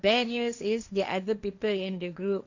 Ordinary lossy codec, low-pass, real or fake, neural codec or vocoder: none; 7.2 kHz; fake; codec, 16 kHz, 1.1 kbps, Voila-Tokenizer